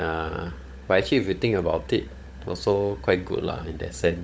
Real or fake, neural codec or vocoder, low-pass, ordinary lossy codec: fake; codec, 16 kHz, 16 kbps, FunCodec, trained on LibriTTS, 50 frames a second; none; none